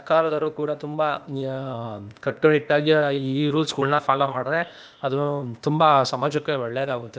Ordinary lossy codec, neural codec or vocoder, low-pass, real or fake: none; codec, 16 kHz, 0.8 kbps, ZipCodec; none; fake